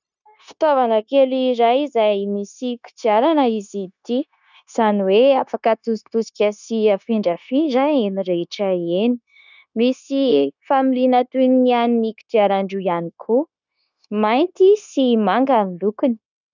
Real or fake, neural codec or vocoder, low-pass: fake; codec, 16 kHz, 0.9 kbps, LongCat-Audio-Codec; 7.2 kHz